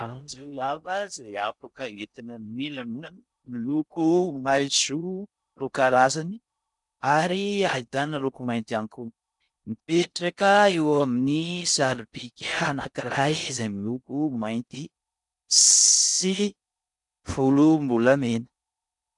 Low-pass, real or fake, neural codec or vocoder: 10.8 kHz; fake; codec, 16 kHz in and 24 kHz out, 0.6 kbps, FocalCodec, streaming, 4096 codes